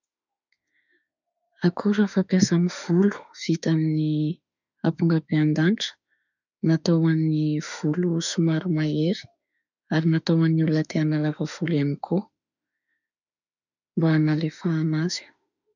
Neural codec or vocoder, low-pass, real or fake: autoencoder, 48 kHz, 32 numbers a frame, DAC-VAE, trained on Japanese speech; 7.2 kHz; fake